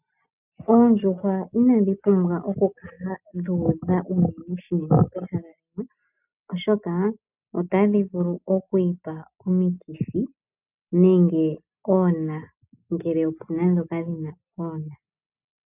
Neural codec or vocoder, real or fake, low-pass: none; real; 3.6 kHz